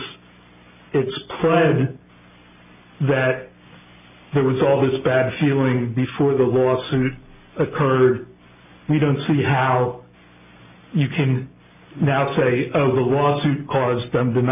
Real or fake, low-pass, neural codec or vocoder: real; 3.6 kHz; none